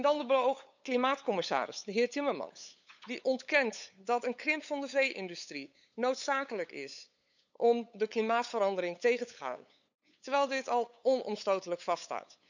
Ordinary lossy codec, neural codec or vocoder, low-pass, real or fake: none; codec, 16 kHz, 8 kbps, FunCodec, trained on LibriTTS, 25 frames a second; 7.2 kHz; fake